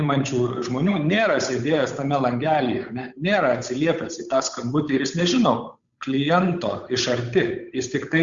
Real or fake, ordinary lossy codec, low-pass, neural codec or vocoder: fake; Opus, 64 kbps; 7.2 kHz; codec, 16 kHz, 8 kbps, FunCodec, trained on Chinese and English, 25 frames a second